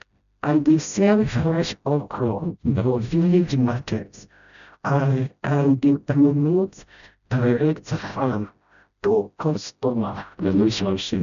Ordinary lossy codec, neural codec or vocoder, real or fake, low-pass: none; codec, 16 kHz, 0.5 kbps, FreqCodec, smaller model; fake; 7.2 kHz